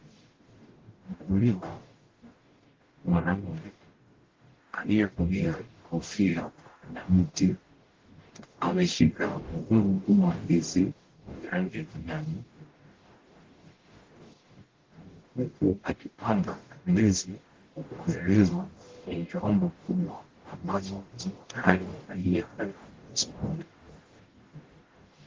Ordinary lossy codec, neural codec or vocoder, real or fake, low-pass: Opus, 16 kbps; codec, 44.1 kHz, 0.9 kbps, DAC; fake; 7.2 kHz